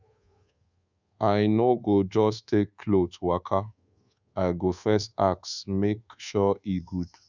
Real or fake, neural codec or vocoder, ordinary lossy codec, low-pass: fake; codec, 24 kHz, 1.2 kbps, DualCodec; Opus, 64 kbps; 7.2 kHz